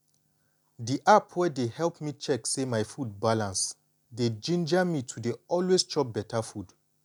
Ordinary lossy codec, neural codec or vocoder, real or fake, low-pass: none; none; real; 19.8 kHz